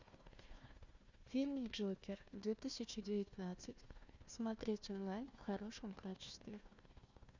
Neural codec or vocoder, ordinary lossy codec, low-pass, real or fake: codec, 16 kHz, 1 kbps, FunCodec, trained on Chinese and English, 50 frames a second; Opus, 64 kbps; 7.2 kHz; fake